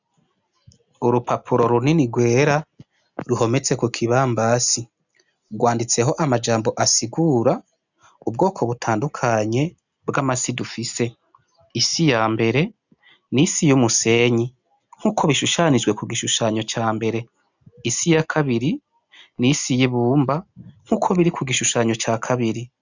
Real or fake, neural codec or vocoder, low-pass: real; none; 7.2 kHz